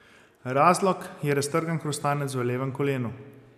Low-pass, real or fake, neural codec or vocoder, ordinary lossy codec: 14.4 kHz; real; none; none